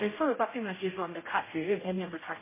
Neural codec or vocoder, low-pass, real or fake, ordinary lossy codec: codec, 16 kHz, 0.5 kbps, X-Codec, HuBERT features, trained on general audio; 3.6 kHz; fake; MP3, 16 kbps